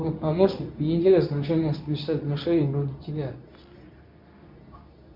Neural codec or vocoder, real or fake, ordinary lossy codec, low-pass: codec, 24 kHz, 0.9 kbps, WavTokenizer, medium speech release version 2; fake; MP3, 48 kbps; 5.4 kHz